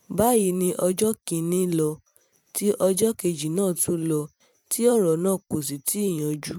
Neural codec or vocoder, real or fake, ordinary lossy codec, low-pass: vocoder, 44.1 kHz, 128 mel bands every 512 samples, BigVGAN v2; fake; none; 19.8 kHz